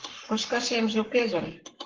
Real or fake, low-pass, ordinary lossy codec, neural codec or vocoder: fake; 7.2 kHz; Opus, 16 kbps; codec, 44.1 kHz, 7.8 kbps, Pupu-Codec